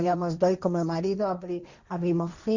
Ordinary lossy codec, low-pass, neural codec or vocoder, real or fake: Opus, 64 kbps; 7.2 kHz; codec, 16 kHz, 1.1 kbps, Voila-Tokenizer; fake